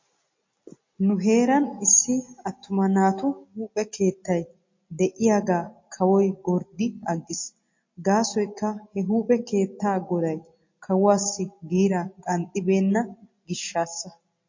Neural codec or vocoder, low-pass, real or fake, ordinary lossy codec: none; 7.2 kHz; real; MP3, 32 kbps